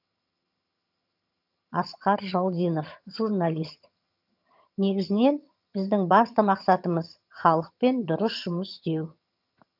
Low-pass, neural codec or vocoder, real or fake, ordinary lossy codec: 5.4 kHz; vocoder, 22.05 kHz, 80 mel bands, HiFi-GAN; fake; none